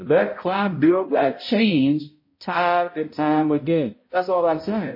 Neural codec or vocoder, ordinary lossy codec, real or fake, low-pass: codec, 16 kHz, 0.5 kbps, X-Codec, HuBERT features, trained on balanced general audio; MP3, 24 kbps; fake; 5.4 kHz